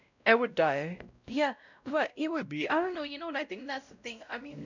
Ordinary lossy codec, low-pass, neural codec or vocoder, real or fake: none; 7.2 kHz; codec, 16 kHz, 0.5 kbps, X-Codec, WavLM features, trained on Multilingual LibriSpeech; fake